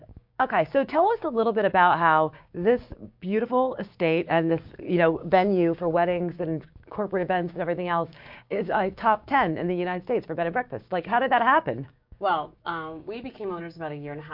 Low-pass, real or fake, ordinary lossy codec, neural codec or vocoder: 5.4 kHz; fake; MP3, 48 kbps; codec, 16 kHz, 6 kbps, DAC